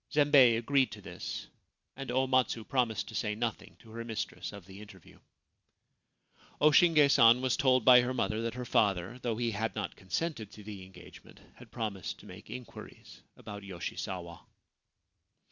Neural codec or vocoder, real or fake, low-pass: none; real; 7.2 kHz